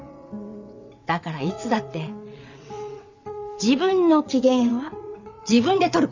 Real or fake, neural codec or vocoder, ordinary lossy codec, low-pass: fake; vocoder, 22.05 kHz, 80 mel bands, WaveNeXt; none; 7.2 kHz